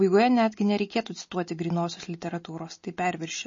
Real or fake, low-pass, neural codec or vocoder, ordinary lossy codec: real; 7.2 kHz; none; MP3, 32 kbps